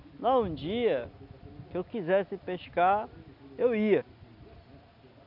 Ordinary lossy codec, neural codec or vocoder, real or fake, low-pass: none; none; real; 5.4 kHz